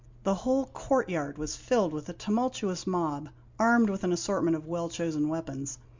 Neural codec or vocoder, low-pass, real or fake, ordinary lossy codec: none; 7.2 kHz; real; MP3, 64 kbps